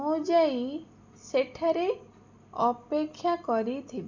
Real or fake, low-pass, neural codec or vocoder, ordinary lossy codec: real; 7.2 kHz; none; none